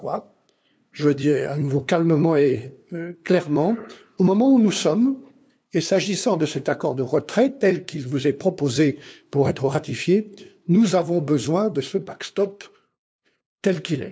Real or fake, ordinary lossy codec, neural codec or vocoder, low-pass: fake; none; codec, 16 kHz, 2 kbps, FunCodec, trained on LibriTTS, 25 frames a second; none